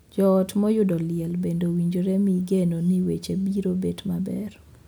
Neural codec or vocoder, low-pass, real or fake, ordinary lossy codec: none; none; real; none